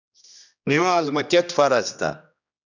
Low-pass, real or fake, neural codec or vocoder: 7.2 kHz; fake; codec, 16 kHz, 1 kbps, X-Codec, HuBERT features, trained on general audio